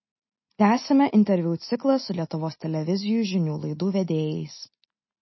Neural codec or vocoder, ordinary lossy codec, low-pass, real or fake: none; MP3, 24 kbps; 7.2 kHz; real